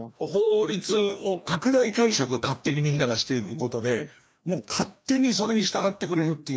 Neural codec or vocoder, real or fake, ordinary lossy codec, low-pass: codec, 16 kHz, 1 kbps, FreqCodec, larger model; fake; none; none